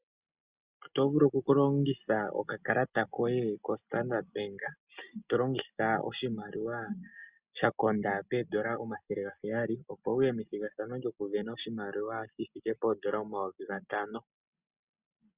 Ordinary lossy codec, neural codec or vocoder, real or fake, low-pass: Opus, 64 kbps; none; real; 3.6 kHz